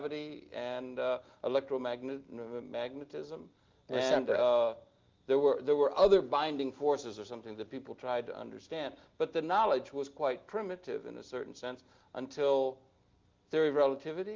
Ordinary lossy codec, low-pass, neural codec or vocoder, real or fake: Opus, 24 kbps; 7.2 kHz; none; real